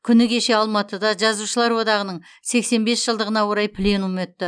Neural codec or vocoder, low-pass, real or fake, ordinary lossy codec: none; 9.9 kHz; real; none